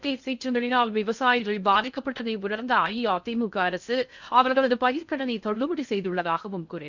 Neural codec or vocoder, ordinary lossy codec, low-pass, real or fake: codec, 16 kHz in and 24 kHz out, 0.6 kbps, FocalCodec, streaming, 2048 codes; none; 7.2 kHz; fake